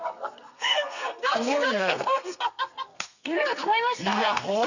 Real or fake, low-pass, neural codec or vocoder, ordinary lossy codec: fake; 7.2 kHz; codec, 32 kHz, 1.9 kbps, SNAC; none